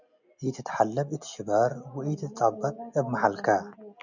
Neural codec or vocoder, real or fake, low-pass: none; real; 7.2 kHz